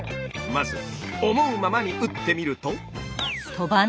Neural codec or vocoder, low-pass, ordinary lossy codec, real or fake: none; none; none; real